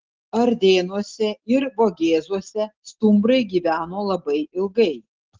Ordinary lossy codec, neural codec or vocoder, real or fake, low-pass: Opus, 16 kbps; none; real; 7.2 kHz